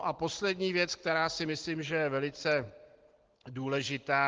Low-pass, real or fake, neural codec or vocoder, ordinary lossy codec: 7.2 kHz; real; none; Opus, 16 kbps